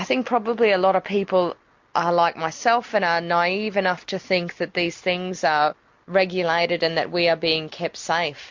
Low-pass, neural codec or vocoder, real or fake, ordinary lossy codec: 7.2 kHz; none; real; MP3, 48 kbps